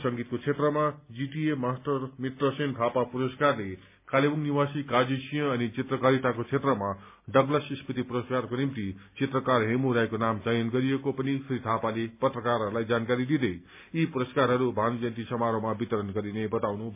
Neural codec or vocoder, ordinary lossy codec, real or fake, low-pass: none; MP3, 32 kbps; real; 3.6 kHz